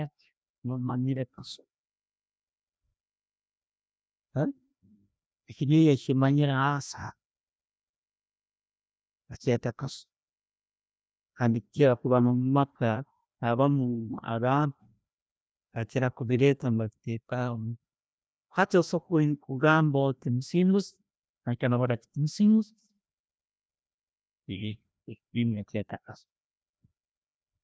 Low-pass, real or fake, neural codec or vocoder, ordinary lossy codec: none; fake; codec, 16 kHz, 1 kbps, FreqCodec, larger model; none